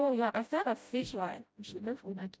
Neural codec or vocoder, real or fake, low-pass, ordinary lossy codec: codec, 16 kHz, 0.5 kbps, FreqCodec, smaller model; fake; none; none